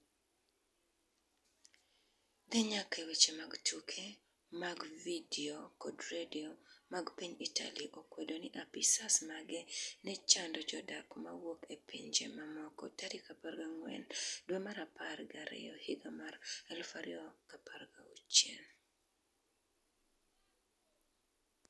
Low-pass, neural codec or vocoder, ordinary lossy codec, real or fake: none; none; none; real